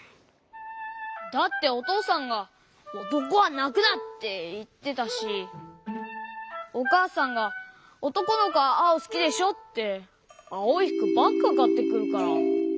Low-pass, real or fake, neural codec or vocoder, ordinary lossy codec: none; real; none; none